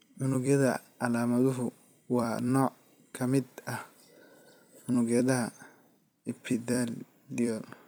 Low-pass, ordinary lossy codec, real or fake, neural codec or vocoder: none; none; fake; vocoder, 44.1 kHz, 128 mel bands every 256 samples, BigVGAN v2